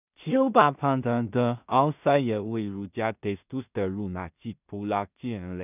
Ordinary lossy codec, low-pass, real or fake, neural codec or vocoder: none; 3.6 kHz; fake; codec, 16 kHz in and 24 kHz out, 0.4 kbps, LongCat-Audio-Codec, two codebook decoder